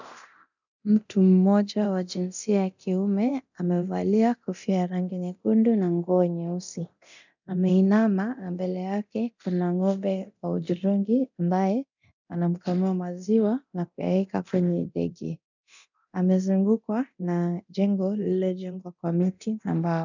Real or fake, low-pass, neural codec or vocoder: fake; 7.2 kHz; codec, 24 kHz, 0.9 kbps, DualCodec